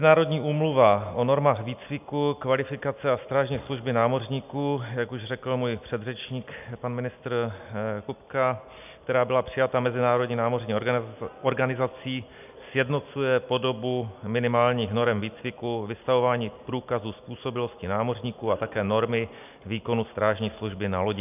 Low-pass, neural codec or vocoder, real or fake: 3.6 kHz; none; real